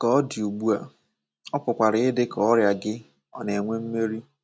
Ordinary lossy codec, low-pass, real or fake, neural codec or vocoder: none; none; real; none